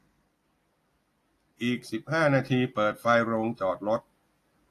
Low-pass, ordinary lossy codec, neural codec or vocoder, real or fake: 14.4 kHz; AAC, 48 kbps; none; real